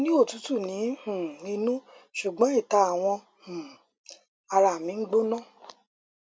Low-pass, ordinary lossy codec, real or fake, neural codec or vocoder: none; none; real; none